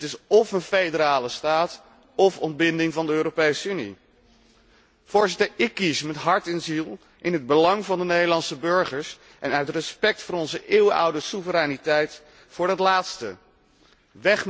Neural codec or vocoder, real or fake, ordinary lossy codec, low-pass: none; real; none; none